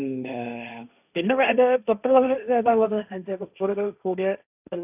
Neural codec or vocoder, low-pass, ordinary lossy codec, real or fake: codec, 16 kHz, 1.1 kbps, Voila-Tokenizer; 3.6 kHz; none; fake